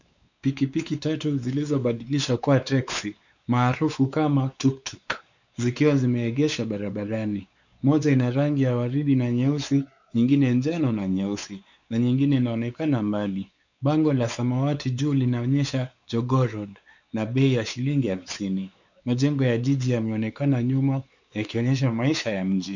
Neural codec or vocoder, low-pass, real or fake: codec, 16 kHz, 4 kbps, X-Codec, WavLM features, trained on Multilingual LibriSpeech; 7.2 kHz; fake